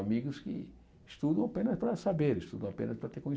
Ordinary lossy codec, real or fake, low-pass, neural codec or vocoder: none; real; none; none